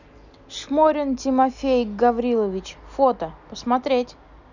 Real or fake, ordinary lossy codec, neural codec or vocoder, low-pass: real; none; none; 7.2 kHz